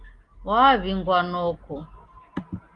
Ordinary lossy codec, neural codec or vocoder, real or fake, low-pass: Opus, 24 kbps; none; real; 9.9 kHz